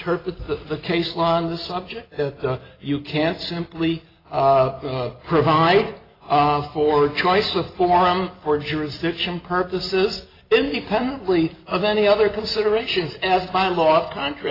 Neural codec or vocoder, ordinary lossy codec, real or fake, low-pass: none; AAC, 24 kbps; real; 5.4 kHz